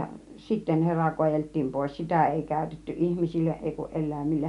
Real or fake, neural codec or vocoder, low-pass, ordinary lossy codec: real; none; 10.8 kHz; none